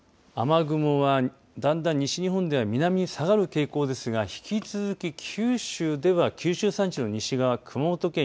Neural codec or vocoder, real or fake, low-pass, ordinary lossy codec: none; real; none; none